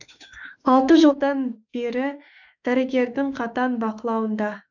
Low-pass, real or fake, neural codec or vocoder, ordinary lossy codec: 7.2 kHz; fake; autoencoder, 48 kHz, 32 numbers a frame, DAC-VAE, trained on Japanese speech; none